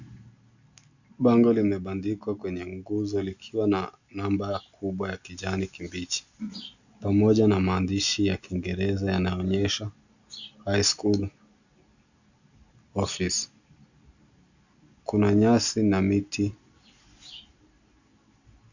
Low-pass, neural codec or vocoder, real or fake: 7.2 kHz; none; real